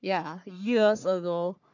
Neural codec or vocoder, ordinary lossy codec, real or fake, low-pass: codec, 44.1 kHz, 3.4 kbps, Pupu-Codec; none; fake; 7.2 kHz